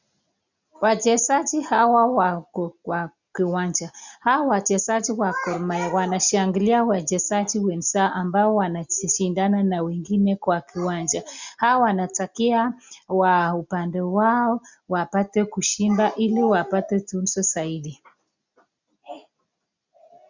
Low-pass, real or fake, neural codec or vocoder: 7.2 kHz; real; none